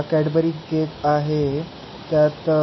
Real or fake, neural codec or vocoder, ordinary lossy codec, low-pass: real; none; MP3, 24 kbps; 7.2 kHz